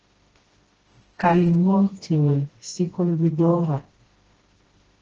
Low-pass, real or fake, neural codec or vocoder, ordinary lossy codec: 7.2 kHz; fake; codec, 16 kHz, 1 kbps, FreqCodec, smaller model; Opus, 16 kbps